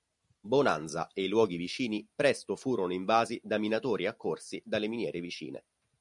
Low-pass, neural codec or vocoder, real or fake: 10.8 kHz; none; real